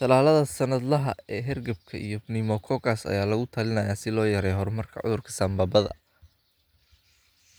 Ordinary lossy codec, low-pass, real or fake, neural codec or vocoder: none; none; real; none